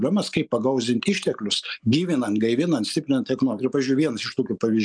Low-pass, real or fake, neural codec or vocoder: 9.9 kHz; real; none